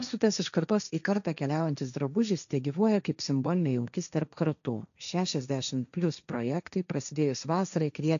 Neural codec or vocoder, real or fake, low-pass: codec, 16 kHz, 1.1 kbps, Voila-Tokenizer; fake; 7.2 kHz